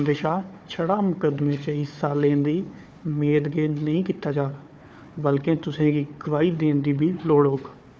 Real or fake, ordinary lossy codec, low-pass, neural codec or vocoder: fake; none; none; codec, 16 kHz, 4 kbps, FunCodec, trained on Chinese and English, 50 frames a second